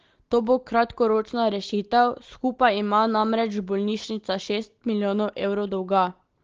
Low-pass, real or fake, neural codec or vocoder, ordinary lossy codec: 7.2 kHz; real; none; Opus, 16 kbps